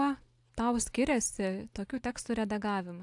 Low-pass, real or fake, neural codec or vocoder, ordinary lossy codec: 10.8 kHz; real; none; AAC, 64 kbps